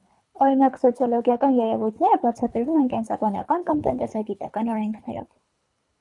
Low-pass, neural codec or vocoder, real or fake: 10.8 kHz; codec, 24 kHz, 3 kbps, HILCodec; fake